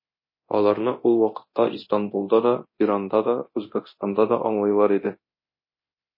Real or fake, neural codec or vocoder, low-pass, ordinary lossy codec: fake; codec, 24 kHz, 0.9 kbps, DualCodec; 5.4 kHz; MP3, 24 kbps